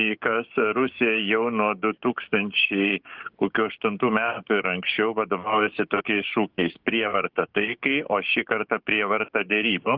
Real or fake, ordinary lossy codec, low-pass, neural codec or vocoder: fake; Opus, 32 kbps; 5.4 kHz; codec, 16 kHz, 6 kbps, DAC